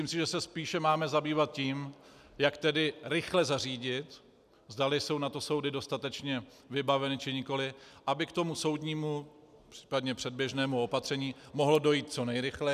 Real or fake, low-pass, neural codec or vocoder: real; 14.4 kHz; none